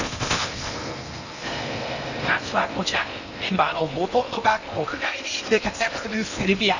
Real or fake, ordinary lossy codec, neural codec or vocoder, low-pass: fake; none; codec, 16 kHz in and 24 kHz out, 0.8 kbps, FocalCodec, streaming, 65536 codes; 7.2 kHz